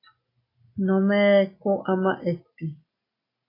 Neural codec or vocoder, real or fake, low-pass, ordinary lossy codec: none; real; 5.4 kHz; AAC, 24 kbps